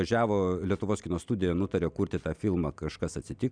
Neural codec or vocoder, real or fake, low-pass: none; real; 9.9 kHz